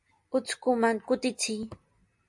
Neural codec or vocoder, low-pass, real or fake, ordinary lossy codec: none; 10.8 kHz; real; MP3, 48 kbps